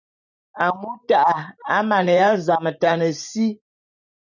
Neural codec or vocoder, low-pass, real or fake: vocoder, 44.1 kHz, 128 mel bands every 512 samples, BigVGAN v2; 7.2 kHz; fake